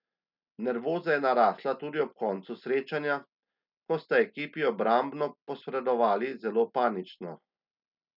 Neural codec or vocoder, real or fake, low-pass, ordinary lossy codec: none; real; 5.4 kHz; none